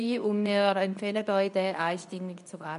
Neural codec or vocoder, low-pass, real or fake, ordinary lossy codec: codec, 24 kHz, 0.9 kbps, WavTokenizer, medium speech release version 2; 10.8 kHz; fake; none